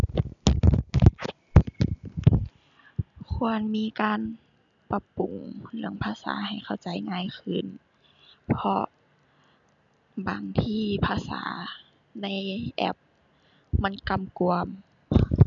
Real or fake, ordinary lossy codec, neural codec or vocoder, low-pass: real; none; none; 7.2 kHz